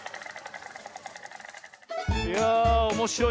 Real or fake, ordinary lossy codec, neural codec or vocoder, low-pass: real; none; none; none